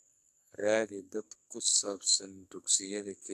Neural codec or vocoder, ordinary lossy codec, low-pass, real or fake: codec, 44.1 kHz, 2.6 kbps, SNAC; AAC, 96 kbps; 14.4 kHz; fake